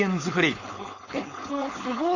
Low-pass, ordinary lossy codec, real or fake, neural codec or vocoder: 7.2 kHz; none; fake; codec, 16 kHz, 4.8 kbps, FACodec